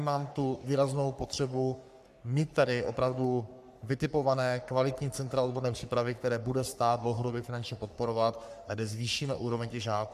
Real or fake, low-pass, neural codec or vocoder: fake; 14.4 kHz; codec, 44.1 kHz, 3.4 kbps, Pupu-Codec